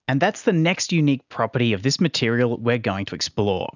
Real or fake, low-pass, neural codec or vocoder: real; 7.2 kHz; none